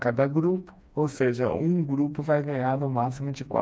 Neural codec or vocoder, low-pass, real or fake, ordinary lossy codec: codec, 16 kHz, 2 kbps, FreqCodec, smaller model; none; fake; none